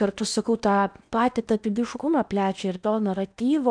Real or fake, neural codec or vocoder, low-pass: fake; codec, 16 kHz in and 24 kHz out, 0.8 kbps, FocalCodec, streaming, 65536 codes; 9.9 kHz